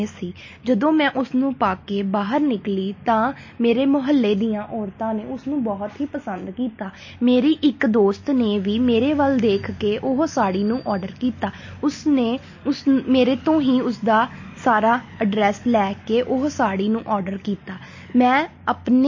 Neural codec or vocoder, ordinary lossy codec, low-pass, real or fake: none; MP3, 32 kbps; 7.2 kHz; real